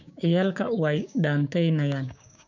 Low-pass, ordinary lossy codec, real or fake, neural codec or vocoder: 7.2 kHz; none; fake; codec, 16 kHz, 6 kbps, DAC